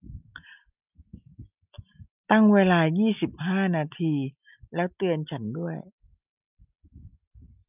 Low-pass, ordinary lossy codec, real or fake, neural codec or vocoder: 3.6 kHz; none; real; none